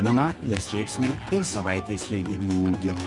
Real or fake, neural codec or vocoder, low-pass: fake; codec, 24 kHz, 0.9 kbps, WavTokenizer, medium music audio release; 10.8 kHz